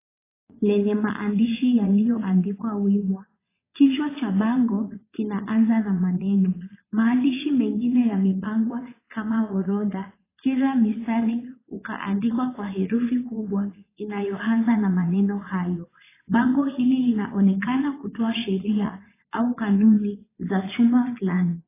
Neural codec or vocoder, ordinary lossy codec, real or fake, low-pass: vocoder, 22.05 kHz, 80 mel bands, Vocos; AAC, 16 kbps; fake; 3.6 kHz